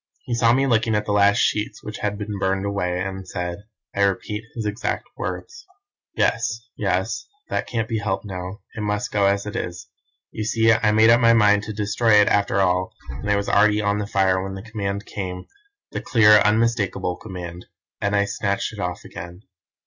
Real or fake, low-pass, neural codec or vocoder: real; 7.2 kHz; none